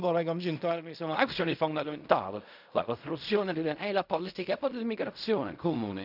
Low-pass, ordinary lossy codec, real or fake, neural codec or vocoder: 5.4 kHz; none; fake; codec, 16 kHz in and 24 kHz out, 0.4 kbps, LongCat-Audio-Codec, fine tuned four codebook decoder